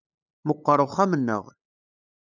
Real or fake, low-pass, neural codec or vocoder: fake; 7.2 kHz; codec, 16 kHz, 8 kbps, FunCodec, trained on LibriTTS, 25 frames a second